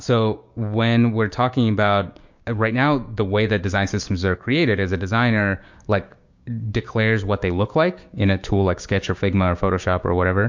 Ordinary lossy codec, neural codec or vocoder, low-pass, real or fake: MP3, 48 kbps; codec, 16 kHz, 6 kbps, DAC; 7.2 kHz; fake